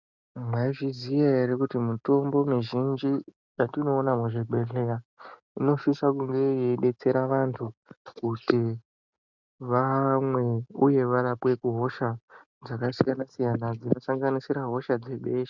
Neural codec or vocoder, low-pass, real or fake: none; 7.2 kHz; real